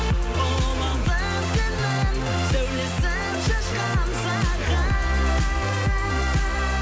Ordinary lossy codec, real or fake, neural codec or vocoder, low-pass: none; real; none; none